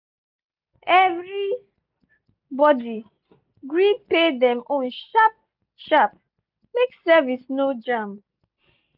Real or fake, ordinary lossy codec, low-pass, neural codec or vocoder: real; none; 5.4 kHz; none